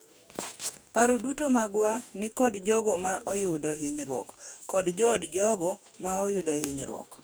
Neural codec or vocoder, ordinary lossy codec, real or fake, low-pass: codec, 44.1 kHz, 2.6 kbps, DAC; none; fake; none